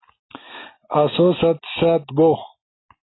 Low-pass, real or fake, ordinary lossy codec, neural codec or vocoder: 7.2 kHz; real; AAC, 16 kbps; none